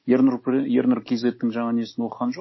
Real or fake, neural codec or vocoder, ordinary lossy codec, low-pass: real; none; MP3, 24 kbps; 7.2 kHz